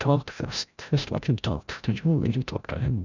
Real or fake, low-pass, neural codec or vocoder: fake; 7.2 kHz; codec, 16 kHz, 0.5 kbps, FreqCodec, larger model